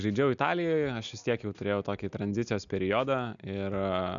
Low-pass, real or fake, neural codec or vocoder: 7.2 kHz; real; none